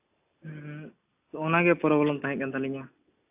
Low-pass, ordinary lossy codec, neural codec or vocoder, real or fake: 3.6 kHz; AAC, 32 kbps; none; real